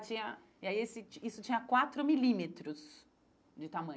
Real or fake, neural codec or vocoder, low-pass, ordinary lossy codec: real; none; none; none